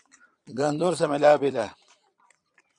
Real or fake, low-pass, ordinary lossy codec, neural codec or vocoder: fake; 9.9 kHz; AAC, 64 kbps; vocoder, 22.05 kHz, 80 mel bands, Vocos